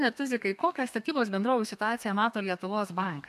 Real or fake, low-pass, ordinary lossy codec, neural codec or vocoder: fake; 14.4 kHz; MP3, 96 kbps; codec, 32 kHz, 1.9 kbps, SNAC